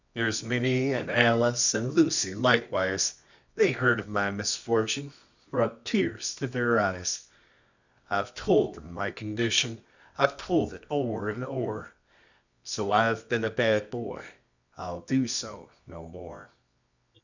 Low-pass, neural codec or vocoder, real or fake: 7.2 kHz; codec, 24 kHz, 0.9 kbps, WavTokenizer, medium music audio release; fake